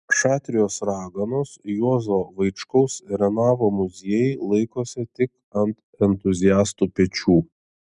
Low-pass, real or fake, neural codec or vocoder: 10.8 kHz; real; none